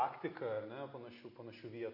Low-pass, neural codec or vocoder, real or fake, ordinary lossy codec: 5.4 kHz; none; real; MP3, 32 kbps